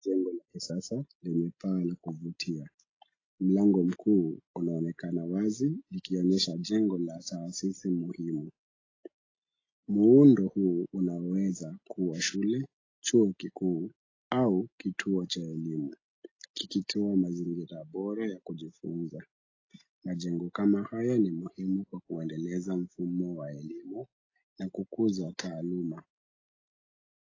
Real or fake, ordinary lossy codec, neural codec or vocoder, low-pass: real; AAC, 32 kbps; none; 7.2 kHz